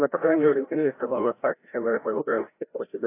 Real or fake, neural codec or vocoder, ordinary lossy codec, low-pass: fake; codec, 16 kHz, 0.5 kbps, FreqCodec, larger model; AAC, 24 kbps; 3.6 kHz